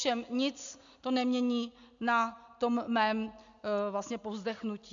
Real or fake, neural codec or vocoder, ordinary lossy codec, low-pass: real; none; MP3, 64 kbps; 7.2 kHz